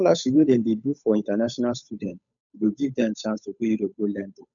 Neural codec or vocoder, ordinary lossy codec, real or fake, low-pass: codec, 16 kHz, 8 kbps, FunCodec, trained on Chinese and English, 25 frames a second; none; fake; 7.2 kHz